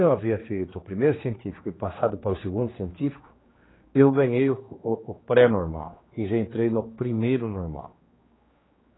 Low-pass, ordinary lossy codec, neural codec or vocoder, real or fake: 7.2 kHz; AAC, 16 kbps; codec, 16 kHz, 2 kbps, X-Codec, HuBERT features, trained on general audio; fake